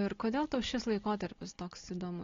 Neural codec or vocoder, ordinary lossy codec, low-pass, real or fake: none; AAC, 32 kbps; 7.2 kHz; real